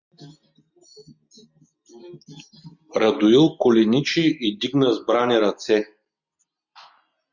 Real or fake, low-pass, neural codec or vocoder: real; 7.2 kHz; none